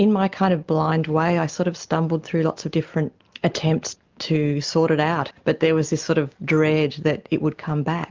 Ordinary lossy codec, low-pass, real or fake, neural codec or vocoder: Opus, 32 kbps; 7.2 kHz; real; none